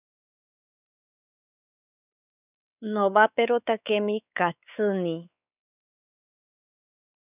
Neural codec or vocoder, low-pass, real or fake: codec, 16 kHz, 4 kbps, X-Codec, WavLM features, trained on Multilingual LibriSpeech; 3.6 kHz; fake